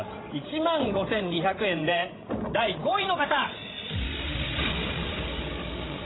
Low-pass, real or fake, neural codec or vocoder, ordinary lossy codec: 7.2 kHz; fake; codec, 16 kHz, 16 kbps, FreqCodec, larger model; AAC, 16 kbps